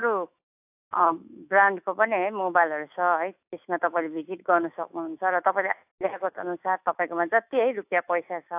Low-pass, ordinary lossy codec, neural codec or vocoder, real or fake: 3.6 kHz; AAC, 32 kbps; autoencoder, 48 kHz, 128 numbers a frame, DAC-VAE, trained on Japanese speech; fake